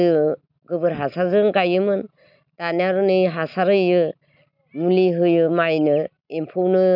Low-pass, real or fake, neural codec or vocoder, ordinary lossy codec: 5.4 kHz; real; none; none